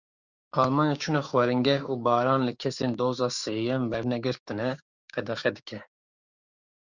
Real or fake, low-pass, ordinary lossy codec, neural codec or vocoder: fake; 7.2 kHz; Opus, 64 kbps; codec, 16 kHz, 6 kbps, DAC